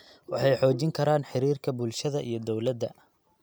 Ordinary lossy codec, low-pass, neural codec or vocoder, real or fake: none; none; none; real